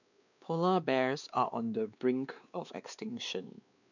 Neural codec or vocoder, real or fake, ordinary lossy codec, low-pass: codec, 16 kHz, 2 kbps, X-Codec, WavLM features, trained on Multilingual LibriSpeech; fake; none; 7.2 kHz